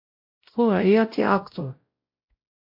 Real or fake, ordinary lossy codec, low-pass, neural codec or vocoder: fake; MP3, 32 kbps; 5.4 kHz; codec, 16 kHz, 0.5 kbps, X-Codec, WavLM features, trained on Multilingual LibriSpeech